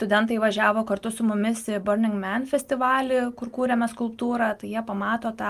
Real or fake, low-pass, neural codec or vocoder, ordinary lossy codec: real; 14.4 kHz; none; Opus, 32 kbps